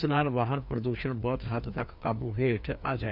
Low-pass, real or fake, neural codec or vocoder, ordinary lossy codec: 5.4 kHz; fake; codec, 16 kHz, 2 kbps, FreqCodec, larger model; none